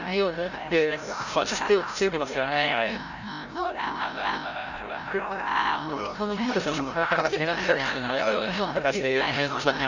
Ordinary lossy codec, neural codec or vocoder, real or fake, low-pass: none; codec, 16 kHz, 0.5 kbps, FreqCodec, larger model; fake; 7.2 kHz